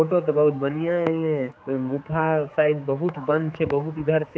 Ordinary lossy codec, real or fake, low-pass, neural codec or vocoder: none; fake; none; codec, 16 kHz, 4 kbps, X-Codec, HuBERT features, trained on general audio